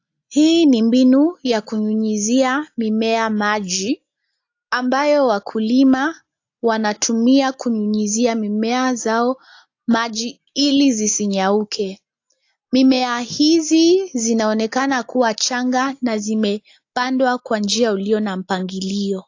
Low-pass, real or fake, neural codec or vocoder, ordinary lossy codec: 7.2 kHz; real; none; AAC, 48 kbps